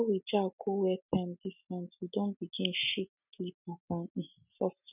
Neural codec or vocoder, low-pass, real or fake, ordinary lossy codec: none; 3.6 kHz; real; none